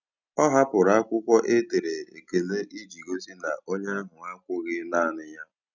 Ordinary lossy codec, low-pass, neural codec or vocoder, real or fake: none; 7.2 kHz; none; real